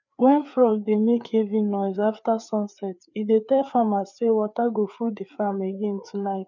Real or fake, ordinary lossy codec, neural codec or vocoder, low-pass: fake; none; codec, 16 kHz, 4 kbps, FreqCodec, larger model; 7.2 kHz